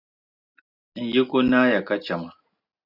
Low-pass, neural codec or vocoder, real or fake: 5.4 kHz; none; real